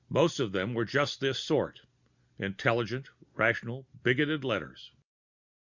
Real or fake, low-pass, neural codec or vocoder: real; 7.2 kHz; none